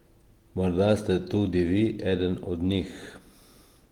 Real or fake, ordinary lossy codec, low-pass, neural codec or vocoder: fake; Opus, 24 kbps; 19.8 kHz; vocoder, 48 kHz, 128 mel bands, Vocos